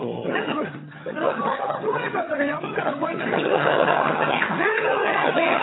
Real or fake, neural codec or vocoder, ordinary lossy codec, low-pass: fake; vocoder, 22.05 kHz, 80 mel bands, HiFi-GAN; AAC, 16 kbps; 7.2 kHz